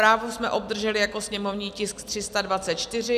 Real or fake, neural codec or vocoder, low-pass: real; none; 14.4 kHz